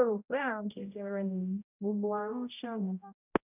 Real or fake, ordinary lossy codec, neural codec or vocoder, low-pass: fake; none; codec, 16 kHz, 0.5 kbps, X-Codec, HuBERT features, trained on general audio; 3.6 kHz